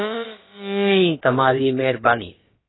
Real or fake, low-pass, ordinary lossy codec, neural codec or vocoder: fake; 7.2 kHz; AAC, 16 kbps; codec, 16 kHz, about 1 kbps, DyCAST, with the encoder's durations